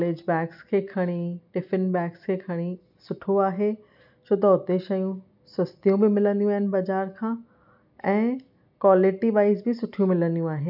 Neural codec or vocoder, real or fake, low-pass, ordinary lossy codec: none; real; 5.4 kHz; none